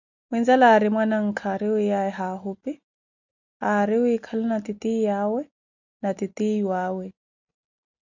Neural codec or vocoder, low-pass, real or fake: none; 7.2 kHz; real